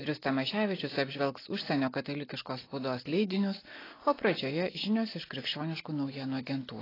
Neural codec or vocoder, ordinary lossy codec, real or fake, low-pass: none; AAC, 24 kbps; real; 5.4 kHz